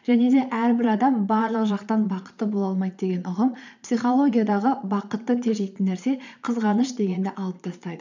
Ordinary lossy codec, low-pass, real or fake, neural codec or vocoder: none; 7.2 kHz; fake; vocoder, 22.05 kHz, 80 mel bands, Vocos